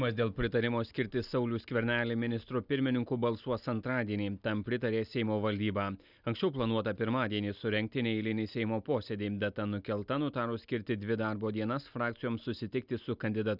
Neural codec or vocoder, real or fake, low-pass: none; real; 5.4 kHz